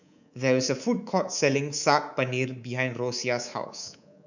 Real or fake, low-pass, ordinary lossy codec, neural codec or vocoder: fake; 7.2 kHz; none; codec, 24 kHz, 3.1 kbps, DualCodec